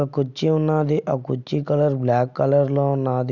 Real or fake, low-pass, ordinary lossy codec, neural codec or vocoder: real; 7.2 kHz; none; none